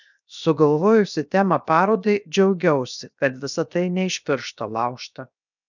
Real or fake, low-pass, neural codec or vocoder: fake; 7.2 kHz; codec, 16 kHz, 0.7 kbps, FocalCodec